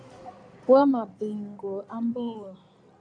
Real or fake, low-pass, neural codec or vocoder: fake; 9.9 kHz; codec, 16 kHz in and 24 kHz out, 2.2 kbps, FireRedTTS-2 codec